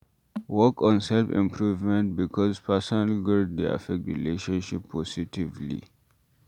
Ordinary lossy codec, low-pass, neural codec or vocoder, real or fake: none; 19.8 kHz; none; real